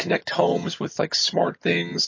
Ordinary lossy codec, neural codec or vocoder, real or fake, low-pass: MP3, 32 kbps; vocoder, 22.05 kHz, 80 mel bands, HiFi-GAN; fake; 7.2 kHz